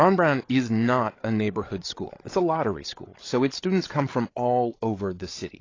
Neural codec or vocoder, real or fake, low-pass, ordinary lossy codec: codec, 16 kHz, 8 kbps, FreqCodec, larger model; fake; 7.2 kHz; AAC, 32 kbps